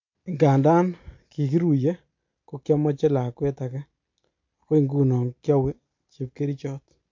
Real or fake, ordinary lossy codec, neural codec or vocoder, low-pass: real; MP3, 48 kbps; none; 7.2 kHz